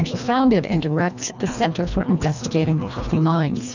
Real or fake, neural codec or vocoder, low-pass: fake; codec, 24 kHz, 1.5 kbps, HILCodec; 7.2 kHz